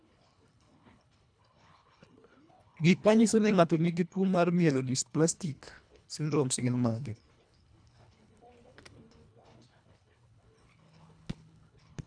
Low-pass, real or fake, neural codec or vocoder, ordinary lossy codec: 9.9 kHz; fake; codec, 24 kHz, 1.5 kbps, HILCodec; none